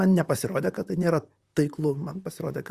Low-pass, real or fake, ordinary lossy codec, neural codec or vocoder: 14.4 kHz; fake; Opus, 64 kbps; vocoder, 44.1 kHz, 128 mel bands, Pupu-Vocoder